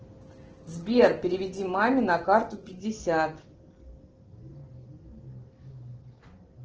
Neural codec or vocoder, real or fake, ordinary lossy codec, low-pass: none; real; Opus, 16 kbps; 7.2 kHz